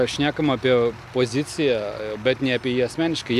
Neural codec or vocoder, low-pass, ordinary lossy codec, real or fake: none; 14.4 kHz; MP3, 96 kbps; real